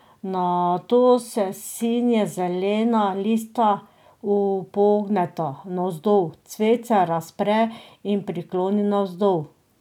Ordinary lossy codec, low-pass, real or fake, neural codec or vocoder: none; 19.8 kHz; real; none